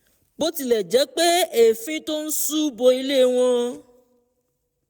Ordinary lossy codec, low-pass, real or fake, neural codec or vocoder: none; none; real; none